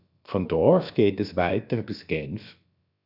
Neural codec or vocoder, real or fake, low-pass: codec, 16 kHz, about 1 kbps, DyCAST, with the encoder's durations; fake; 5.4 kHz